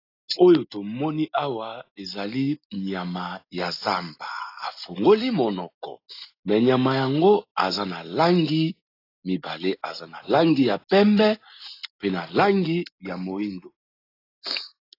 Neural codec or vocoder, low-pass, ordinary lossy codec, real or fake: none; 5.4 kHz; AAC, 32 kbps; real